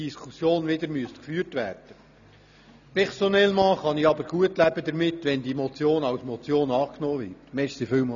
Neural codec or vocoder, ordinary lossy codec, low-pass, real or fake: none; none; 7.2 kHz; real